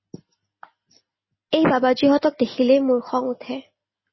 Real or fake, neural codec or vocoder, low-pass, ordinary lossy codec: real; none; 7.2 kHz; MP3, 24 kbps